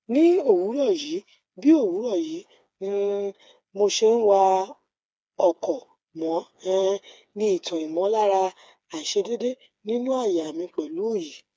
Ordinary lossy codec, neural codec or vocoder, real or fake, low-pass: none; codec, 16 kHz, 4 kbps, FreqCodec, smaller model; fake; none